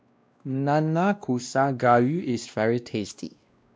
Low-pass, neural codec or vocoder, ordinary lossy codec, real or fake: none; codec, 16 kHz, 1 kbps, X-Codec, WavLM features, trained on Multilingual LibriSpeech; none; fake